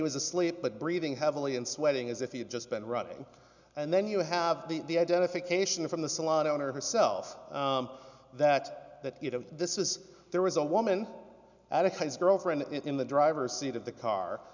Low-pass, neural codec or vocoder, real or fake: 7.2 kHz; none; real